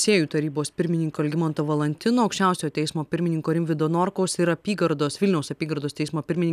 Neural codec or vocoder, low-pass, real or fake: none; 14.4 kHz; real